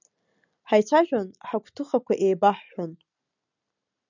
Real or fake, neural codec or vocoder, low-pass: real; none; 7.2 kHz